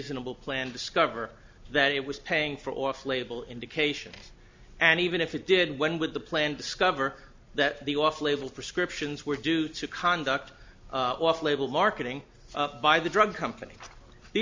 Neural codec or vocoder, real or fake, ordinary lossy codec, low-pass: none; real; MP3, 64 kbps; 7.2 kHz